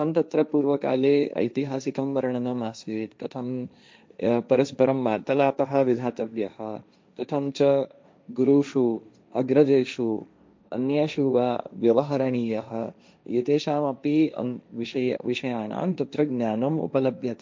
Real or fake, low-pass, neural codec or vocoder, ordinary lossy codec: fake; none; codec, 16 kHz, 1.1 kbps, Voila-Tokenizer; none